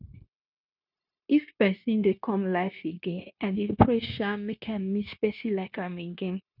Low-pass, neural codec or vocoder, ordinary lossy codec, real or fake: 5.4 kHz; codec, 16 kHz, 0.9 kbps, LongCat-Audio-Codec; none; fake